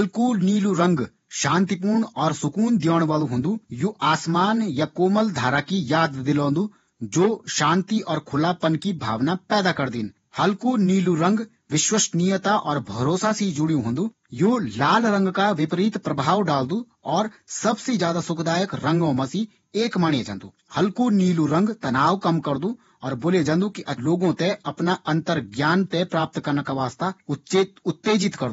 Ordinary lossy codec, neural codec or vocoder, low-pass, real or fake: AAC, 24 kbps; vocoder, 48 kHz, 128 mel bands, Vocos; 19.8 kHz; fake